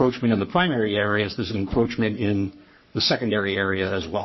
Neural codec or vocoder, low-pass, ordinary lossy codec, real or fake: codec, 44.1 kHz, 3.4 kbps, Pupu-Codec; 7.2 kHz; MP3, 24 kbps; fake